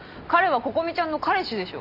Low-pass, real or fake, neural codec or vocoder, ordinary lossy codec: 5.4 kHz; real; none; none